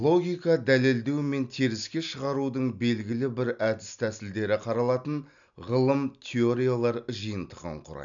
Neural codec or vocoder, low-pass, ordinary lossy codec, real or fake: none; 7.2 kHz; none; real